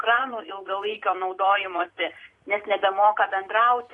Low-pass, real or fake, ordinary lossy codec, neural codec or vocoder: 10.8 kHz; fake; AAC, 48 kbps; vocoder, 44.1 kHz, 128 mel bands every 256 samples, BigVGAN v2